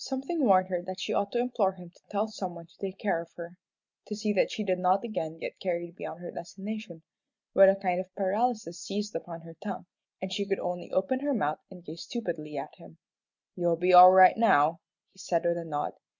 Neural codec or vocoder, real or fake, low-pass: none; real; 7.2 kHz